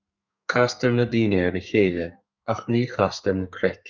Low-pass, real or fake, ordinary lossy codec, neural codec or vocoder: 7.2 kHz; fake; Opus, 64 kbps; codec, 32 kHz, 1.9 kbps, SNAC